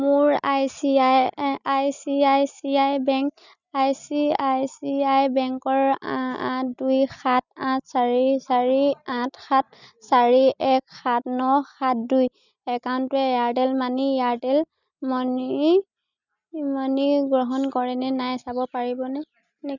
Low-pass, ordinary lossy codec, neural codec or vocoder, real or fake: 7.2 kHz; none; none; real